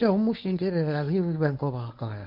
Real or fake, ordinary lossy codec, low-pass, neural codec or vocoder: fake; none; 5.4 kHz; codec, 24 kHz, 0.9 kbps, WavTokenizer, medium speech release version 1